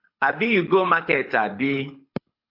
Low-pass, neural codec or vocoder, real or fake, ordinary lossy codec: 5.4 kHz; codec, 24 kHz, 6 kbps, HILCodec; fake; MP3, 48 kbps